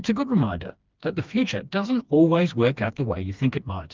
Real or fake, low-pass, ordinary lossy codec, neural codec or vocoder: fake; 7.2 kHz; Opus, 24 kbps; codec, 16 kHz, 2 kbps, FreqCodec, smaller model